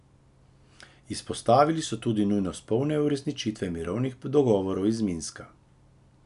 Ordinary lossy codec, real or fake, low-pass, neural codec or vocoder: none; real; 10.8 kHz; none